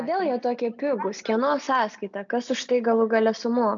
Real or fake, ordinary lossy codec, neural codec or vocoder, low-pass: real; AAC, 64 kbps; none; 7.2 kHz